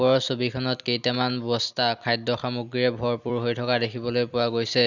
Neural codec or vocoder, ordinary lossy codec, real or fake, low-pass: vocoder, 44.1 kHz, 128 mel bands every 256 samples, BigVGAN v2; none; fake; 7.2 kHz